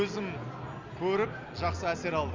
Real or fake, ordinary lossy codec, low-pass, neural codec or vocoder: real; MP3, 64 kbps; 7.2 kHz; none